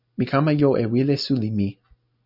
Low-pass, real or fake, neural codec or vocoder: 5.4 kHz; real; none